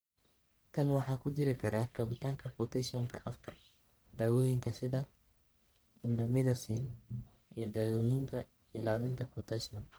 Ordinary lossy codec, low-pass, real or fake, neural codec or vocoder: none; none; fake; codec, 44.1 kHz, 1.7 kbps, Pupu-Codec